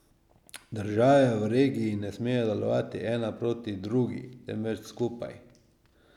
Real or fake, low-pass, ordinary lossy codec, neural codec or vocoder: real; 19.8 kHz; none; none